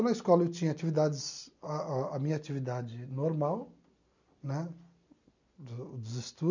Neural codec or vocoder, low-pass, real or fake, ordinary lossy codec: none; 7.2 kHz; real; none